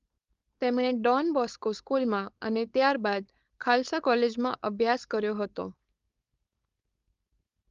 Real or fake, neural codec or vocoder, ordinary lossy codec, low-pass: fake; codec, 16 kHz, 4.8 kbps, FACodec; Opus, 32 kbps; 7.2 kHz